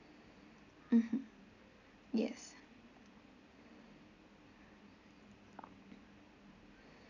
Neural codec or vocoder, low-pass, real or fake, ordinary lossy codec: none; 7.2 kHz; real; none